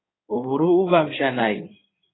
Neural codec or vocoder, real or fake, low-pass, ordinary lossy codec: codec, 16 kHz in and 24 kHz out, 2.2 kbps, FireRedTTS-2 codec; fake; 7.2 kHz; AAC, 16 kbps